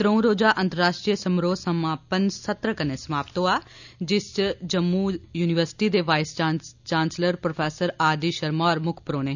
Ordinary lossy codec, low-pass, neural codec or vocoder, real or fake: none; 7.2 kHz; none; real